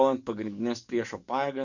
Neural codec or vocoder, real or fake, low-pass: codec, 44.1 kHz, 7.8 kbps, DAC; fake; 7.2 kHz